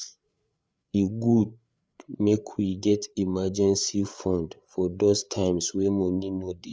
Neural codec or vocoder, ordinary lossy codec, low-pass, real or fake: codec, 16 kHz, 8 kbps, FreqCodec, larger model; none; none; fake